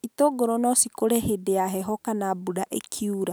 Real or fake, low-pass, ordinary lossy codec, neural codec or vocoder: real; none; none; none